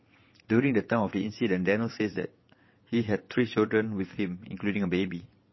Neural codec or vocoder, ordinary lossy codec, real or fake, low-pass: vocoder, 22.05 kHz, 80 mel bands, WaveNeXt; MP3, 24 kbps; fake; 7.2 kHz